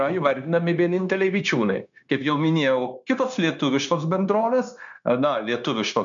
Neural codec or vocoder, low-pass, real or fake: codec, 16 kHz, 0.9 kbps, LongCat-Audio-Codec; 7.2 kHz; fake